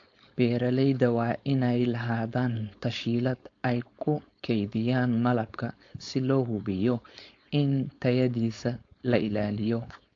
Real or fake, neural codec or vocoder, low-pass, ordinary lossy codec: fake; codec, 16 kHz, 4.8 kbps, FACodec; 7.2 kHz; AAC, 48 kbps